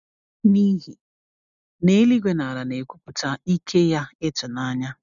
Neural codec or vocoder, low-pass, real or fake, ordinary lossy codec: none; 7.2 kHz; real; none